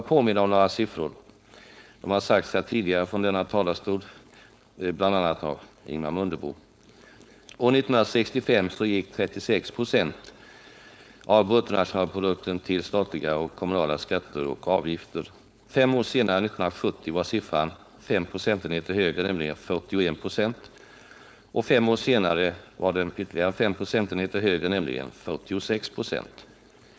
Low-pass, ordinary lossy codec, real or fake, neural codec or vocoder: none; none; fake; codec, 16 kHz, 4.8 kbps, FACodec